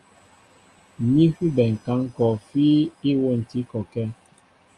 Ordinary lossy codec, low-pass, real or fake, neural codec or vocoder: Opus, 32 kbps; 10.8 kHz; real; none